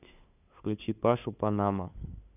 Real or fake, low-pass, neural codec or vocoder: fake; 3.6 kHz; codec, 16 kHz, 2 kbps, FunCodec, trained on LibriTTS, 25 frames a second